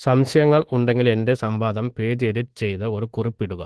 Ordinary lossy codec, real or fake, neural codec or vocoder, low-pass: Opus, 16 kbps; fake; codec, 24 kHz, 1.2 kbps, DualCodec; 10.8 kHz